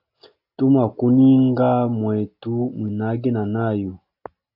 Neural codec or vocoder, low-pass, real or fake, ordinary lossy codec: none; 5.4 kHz; real; AAC, 48 kbps